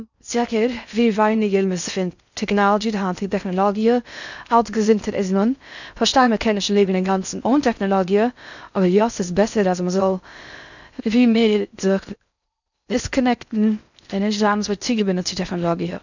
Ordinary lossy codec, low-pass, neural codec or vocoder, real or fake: none; 7.2 kHz; codec, 16 kHz in and 24 kHz out, 0.6 kbps, FocalCodec, streaming, 4096 codes; fake